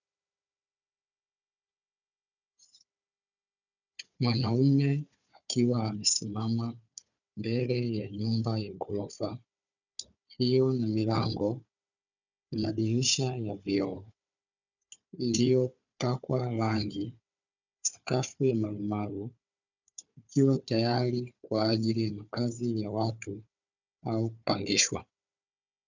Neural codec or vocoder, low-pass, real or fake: codec, 16 kHz, 4 kbps, FunCodec, trained on Chinese and English, 50 frames a second; 7.2 kHz; fake